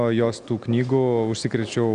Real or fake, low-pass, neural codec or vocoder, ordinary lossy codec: real; 9.9 kHz; none; Opus, 64 kbps